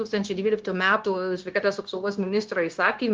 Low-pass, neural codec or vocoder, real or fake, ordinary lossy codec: 7.2 kHz; codec, 16 kHz, 0.9 kbps, LongCat-Audio-Codec; fake; Opus, 24 kbps